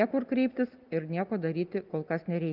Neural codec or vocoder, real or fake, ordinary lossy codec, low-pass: none; real; Opus, 32 kbps; 5.4 kHz